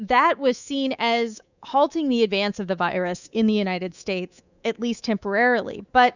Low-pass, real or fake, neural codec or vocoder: 7.2 kHz; fake; codec, 24 kHz, 3.1 kbps, DualCodec